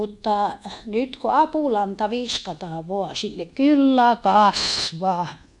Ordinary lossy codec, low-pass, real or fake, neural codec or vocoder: none; 10.8 kHz; fake; codec, 24 kHz, 1.2 kbps, DualCodec